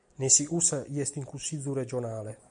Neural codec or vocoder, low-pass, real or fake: none; 9.9 kHz; real